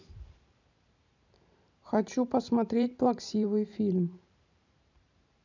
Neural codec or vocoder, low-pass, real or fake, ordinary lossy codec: vocoder, 44.1 kHz, 128 mel bands every 512 samples, BigVGAN v2; 7.2 kHz; fake; none